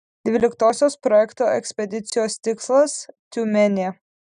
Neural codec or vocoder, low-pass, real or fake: none; 9.9 kHz; real